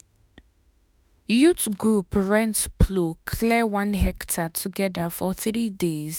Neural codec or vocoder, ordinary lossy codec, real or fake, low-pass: autoencoder, 48 kHz, 32 numbers a frame, DAC-VAE, trained on Japanese speech; none; fake; none